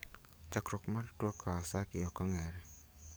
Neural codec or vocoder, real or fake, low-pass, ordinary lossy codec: codec, 44.1 kHz, 7.8 kbps, DAC; fake; none; none